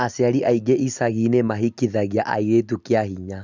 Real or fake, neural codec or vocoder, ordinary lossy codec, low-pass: real; none; none; 7.2 kHz